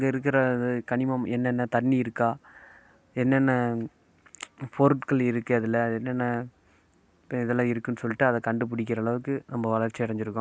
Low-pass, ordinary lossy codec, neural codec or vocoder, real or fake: none; none; none; real